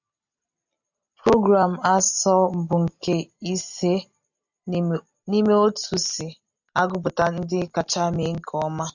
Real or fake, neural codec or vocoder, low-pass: real; none; 7.2 kHz